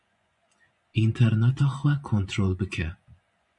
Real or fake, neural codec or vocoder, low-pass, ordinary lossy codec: fake; vocoder, 24 kHz, 100 mel bands, Vocos; 10.8 kHz; MP3, 64 kbps